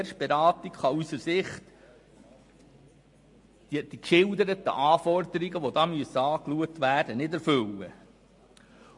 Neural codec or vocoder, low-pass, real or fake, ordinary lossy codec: none; 10.8 kHz; real; MP3, 48 kbps